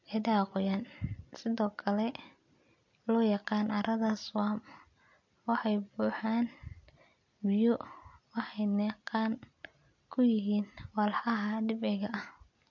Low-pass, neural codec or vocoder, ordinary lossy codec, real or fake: 7.2 kHz; none; MP3, 48 kbps; real